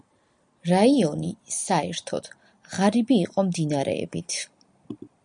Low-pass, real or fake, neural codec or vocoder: 9.9 kHz; real; none